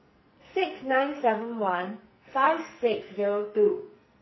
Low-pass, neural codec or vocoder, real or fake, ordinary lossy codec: 7.2 kHz; codec, 44.1 kHz, 2.6 kbps, SNAC; fake; MP3, 24 kbps